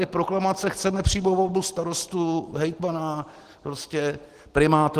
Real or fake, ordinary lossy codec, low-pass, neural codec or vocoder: real; Opus, 16 kbps; 14.4 kHz; none